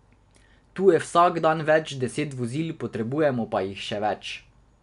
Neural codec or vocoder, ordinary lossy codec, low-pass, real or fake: none; none; 10.8 kHz; real